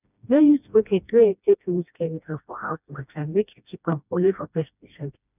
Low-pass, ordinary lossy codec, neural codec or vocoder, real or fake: 3.6 kHz; none; codec, 16 kHz, 1 kbps, FreqCodec, smaller model; fake